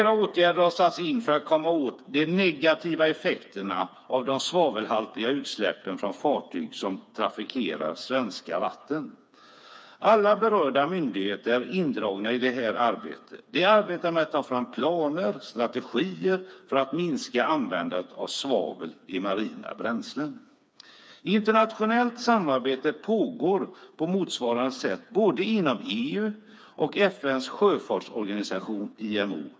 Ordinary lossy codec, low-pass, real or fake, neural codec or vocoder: none; none; fake; codec, 16 kHz, 4 kbps, FreqCodec, smaller model